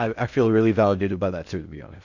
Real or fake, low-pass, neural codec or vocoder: fake; 7.2 kHz; codec, 16 kHz in and 24 kHz out, 0.6 kbps, FocalCodec, streaming, 4096 codes